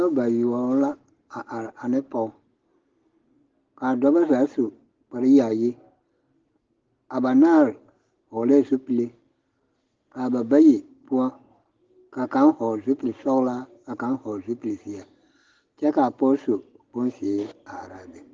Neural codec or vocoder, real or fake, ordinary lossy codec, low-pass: none; real; Opus, 16 kbps; 7.2 kHz